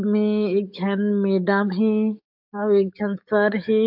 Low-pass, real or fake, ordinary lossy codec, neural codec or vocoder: 5.4 kHz; fake; MP3, 48 kbps; codec, 44.1 kHz, 7.8 kbps, DAC